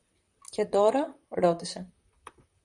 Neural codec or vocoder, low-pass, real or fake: vocoder, 44.1 kHz, 128 mel bands, Pupu-Vocoder; 10.8 kHz; fake